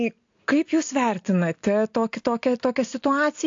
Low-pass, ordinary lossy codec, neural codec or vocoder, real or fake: 7.2 kHz; AAC, 48 kbps; none; real